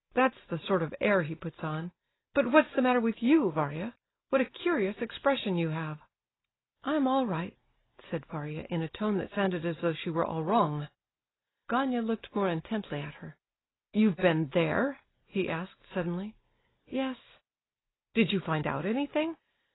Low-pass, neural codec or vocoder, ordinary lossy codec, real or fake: 7.2 kHz; none; AAC, 16 kbps; real